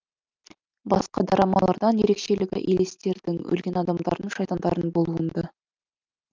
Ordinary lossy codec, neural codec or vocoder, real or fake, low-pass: Opus, 24 kbps; none; real; 7.2 kHz